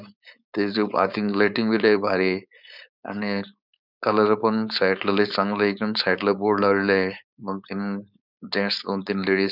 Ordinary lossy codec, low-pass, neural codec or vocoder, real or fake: none; 5.4 kHz; codec, 16 kHz, 4.8 kbps, FACodec; fake